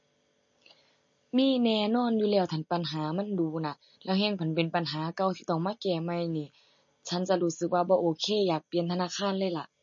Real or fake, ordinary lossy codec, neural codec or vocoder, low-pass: real; MP3, 32 kbps; none; 7.2 kHz